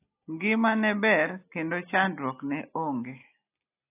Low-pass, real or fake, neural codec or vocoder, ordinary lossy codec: 3.6 kHz; real; none; AAC, 24 kbps